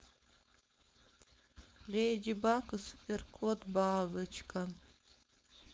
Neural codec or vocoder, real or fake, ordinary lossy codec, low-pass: codec, 16 kHz, 4.8 kbps, FACodec; fake; none; none